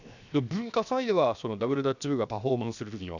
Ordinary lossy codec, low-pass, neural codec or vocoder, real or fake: none; 7.2 kHz; codec, 16 kHz, 0.7 kbps, FocalCodec; fake